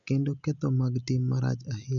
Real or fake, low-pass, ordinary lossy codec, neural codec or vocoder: real; 7.2 kHz; none; none